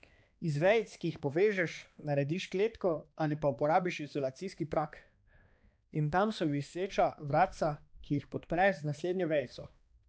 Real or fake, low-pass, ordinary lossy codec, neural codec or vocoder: fake; none; none; codec, 16 kHz, 2 kbps, X-Codec, HuBERT features, trained on balanced general audio